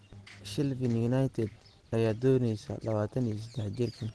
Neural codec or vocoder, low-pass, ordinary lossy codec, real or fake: none; 10.8 kHz; Opus, 16 kbps; real